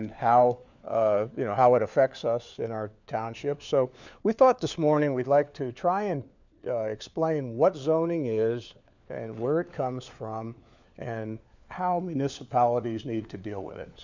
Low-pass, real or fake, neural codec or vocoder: 7.2 kHz; fake; codec, 16 kHz, 4 kbps, FunCodec, trained on LibriTTS, 50 frames a second